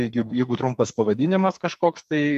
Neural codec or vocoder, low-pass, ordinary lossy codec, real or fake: codec, 44.1 kHz, 2.6 kbps, SNAC; 14.4 kHz; MP3, 64 kbps; fake